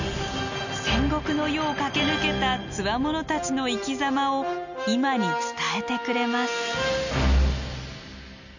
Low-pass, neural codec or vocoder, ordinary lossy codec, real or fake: 7.2 kHz; none; none; real